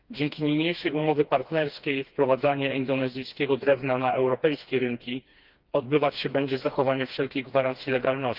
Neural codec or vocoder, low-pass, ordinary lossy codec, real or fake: codec, 16 kHz, 2 kbps, FreqCodec, smaller model; 5.4 kHz; Opus, 32 kbps; fake